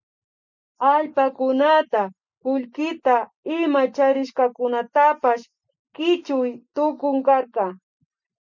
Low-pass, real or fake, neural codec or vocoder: 7.2 kHz; real; none